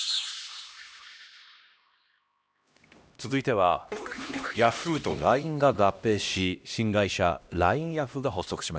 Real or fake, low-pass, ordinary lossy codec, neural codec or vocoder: fake; none; none; codec, 16 kHz, 1 kbps, X-Codec, HuBERT features, trained on LibriSpeech